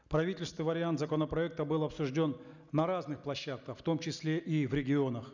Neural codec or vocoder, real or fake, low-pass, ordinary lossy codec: none; real; 7.2 kHz; none